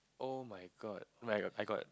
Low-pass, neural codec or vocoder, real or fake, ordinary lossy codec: none; none; real; none